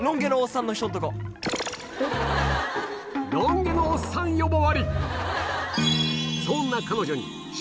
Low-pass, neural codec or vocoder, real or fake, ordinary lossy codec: none; none; real; none